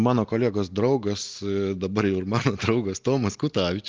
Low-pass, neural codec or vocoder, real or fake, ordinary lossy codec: 7.2 kHz; none; real; Opus, 24 kbps